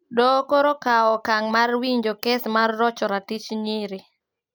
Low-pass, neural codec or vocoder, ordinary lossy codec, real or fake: none; none; none; real